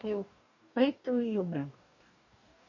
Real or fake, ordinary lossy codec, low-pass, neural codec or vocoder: fake; none; 7.2 kHz; codec, 44.1 kHz, 2.6 kbps, DAC